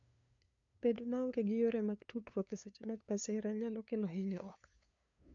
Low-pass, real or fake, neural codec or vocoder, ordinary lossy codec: 7.2 kHz; fake; codec, 16 kHz, 2 kbps, FunCodec, trained on LibriTTS, 25 frames a second; MP3, 64 kbps